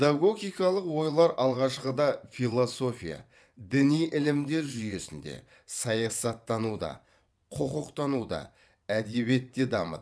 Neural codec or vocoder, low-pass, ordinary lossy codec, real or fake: vocoder, 22.05 kHz, 80 mel bands, WaveNeXt; none; none; fake